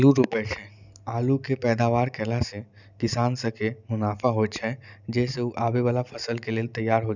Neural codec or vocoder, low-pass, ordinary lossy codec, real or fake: none; 7.2 kHz; none; real